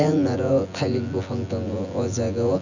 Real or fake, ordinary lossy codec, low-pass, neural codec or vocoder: fake; none; 7.2 kHz; vocoder, 24 kHz, 100 mel bands, Vocos